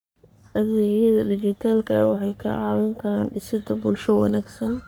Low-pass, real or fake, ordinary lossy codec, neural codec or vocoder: none; fake; none; codec, 44.1 kHz, 3.4 kbps, Pupu-Codec